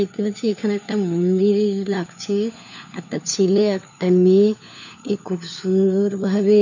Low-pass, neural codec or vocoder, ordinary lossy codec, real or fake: 7.2 kHz; codec, 16 kHz, 4 kbps, FunCodec, trained on LibriTTS, 50 frames a second; none; fake